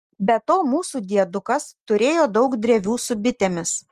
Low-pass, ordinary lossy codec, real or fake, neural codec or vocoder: 14.4 kHz; Opus, 24 kbps; real; none